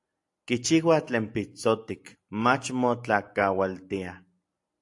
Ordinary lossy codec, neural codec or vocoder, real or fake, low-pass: AAC, 64 kbps; none; real; 10.8 kHz